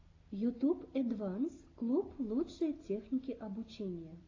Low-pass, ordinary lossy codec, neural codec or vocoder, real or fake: 7.2 kHz; AAC, 32 kbps; none; real